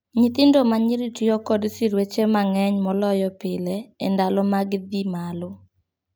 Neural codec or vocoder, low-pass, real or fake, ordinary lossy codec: none; none; real; none